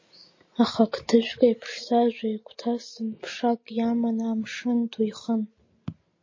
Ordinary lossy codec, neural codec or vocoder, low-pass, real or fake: MP3, 32 kbps; none; 7.2 kHz; real